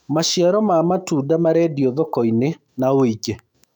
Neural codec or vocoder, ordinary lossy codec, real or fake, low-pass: autoencoder, 48 kHz, 128 numbers a frame, DAC-VAE, trained on Japanese speech; none; fake; 19.8 kHz